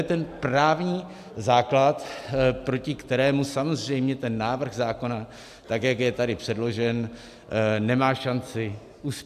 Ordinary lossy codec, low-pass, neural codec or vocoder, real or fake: AAC, 96 kbps; 14.4 kHz; none; real